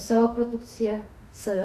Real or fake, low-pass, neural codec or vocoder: fake; 14.4 kHz; autoencoder, 48 kHz, 32 numbers a frame, DAC-VAE, trained on Japanese speech